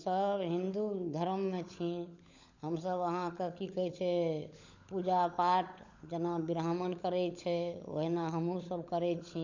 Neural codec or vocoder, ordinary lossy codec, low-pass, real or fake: codec, 16 kHz, 16 kbps, FunCodec, trained on LibriTTS, 50 frames a second; none; 7.2 kHz; fake